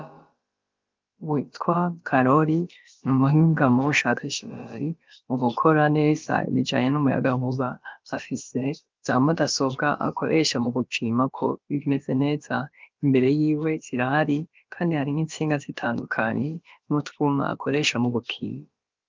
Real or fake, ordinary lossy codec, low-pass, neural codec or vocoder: fake; Opus, 32 kbps; 7.2 kHz; codec, 16 kHz, about 1 kbps, DyCAST, with the encoder's durations